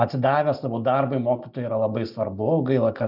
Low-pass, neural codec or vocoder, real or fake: 5.4 kHz; none; real